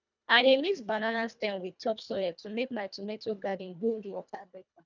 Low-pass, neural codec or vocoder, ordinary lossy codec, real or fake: 7.2 kHz; codec, 24 kHz, 1.5 kbps, HILCodec; none; fake